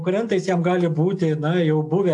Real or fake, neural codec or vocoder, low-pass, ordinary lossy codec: real; none; 10.8 kHz; AAC, 64 kbps